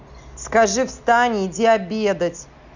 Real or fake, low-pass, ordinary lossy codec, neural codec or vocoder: real; 7.2 kHz; none; none